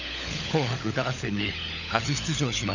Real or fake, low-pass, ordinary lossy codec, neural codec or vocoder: fake; 7.2 kHz; none; codec, 16 kHz, 16 kbps, FunCodec, trained on LibriTTS, 50 frames a second